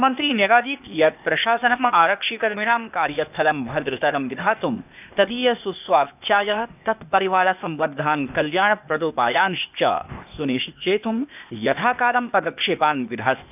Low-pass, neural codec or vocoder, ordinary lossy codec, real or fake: 3.6 kHz; codec, 16 kHz, 0.8 kbps, ZipCodec; none; fake